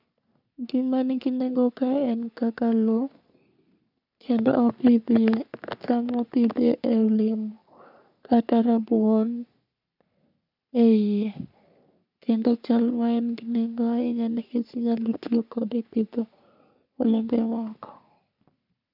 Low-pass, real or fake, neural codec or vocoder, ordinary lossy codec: 5.4 kHz; fake; codec, 44.1 kHz, 3.4 kbps, Pupu-Codec; none